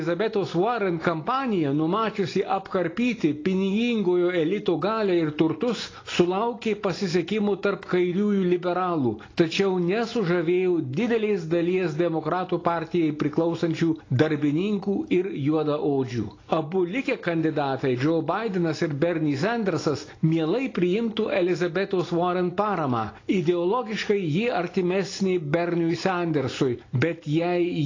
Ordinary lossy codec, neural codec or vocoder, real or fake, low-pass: AAC, 32 kbps; none; real; 7.2 kHz